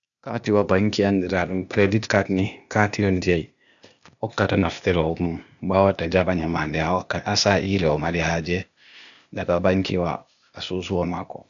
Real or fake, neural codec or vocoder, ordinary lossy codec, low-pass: fake; codec, 16 kHz, 0.8 kbps, ZipCodec; MP3, 96 kbps; 7.2 kHz